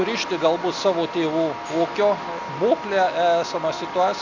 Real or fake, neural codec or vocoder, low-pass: real; none; 7.2 kHz